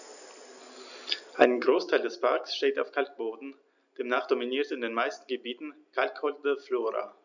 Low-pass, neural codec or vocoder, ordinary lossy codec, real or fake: 7.2 kHz; none; none; real